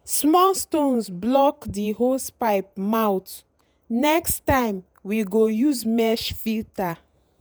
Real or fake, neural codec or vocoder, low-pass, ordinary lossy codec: fake; vocoder, 48 kHz, 128 mel bands, Vocos; none; none